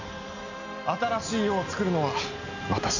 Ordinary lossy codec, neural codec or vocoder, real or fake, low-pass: none; none; real; 7.2 kHz